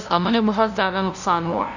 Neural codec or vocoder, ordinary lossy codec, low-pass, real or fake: codec, 16 kHz, 0.5 kbps, FunCodec, trained on LibriTTS, 25 frames a second; none; 7.2 kHz; fake